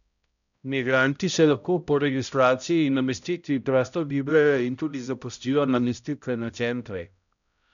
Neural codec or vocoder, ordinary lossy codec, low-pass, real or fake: codec, 16 kHz, 0.5 kbps, X-Codec, HuBERT features, trained on balanced general audio; none; 7.2 kHz; fake